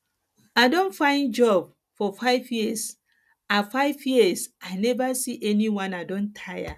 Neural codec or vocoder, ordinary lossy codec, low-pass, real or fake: none; none; 14.4 kHz; real